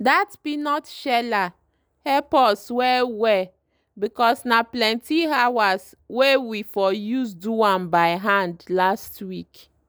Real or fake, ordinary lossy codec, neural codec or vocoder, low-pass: real; none; none; none